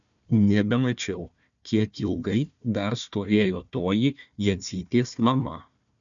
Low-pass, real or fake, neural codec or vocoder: 7.2 kHz; fake; codec, 16 kHz, 1 kbps, FunCodec, trained on Chinese and English, 50 frames a second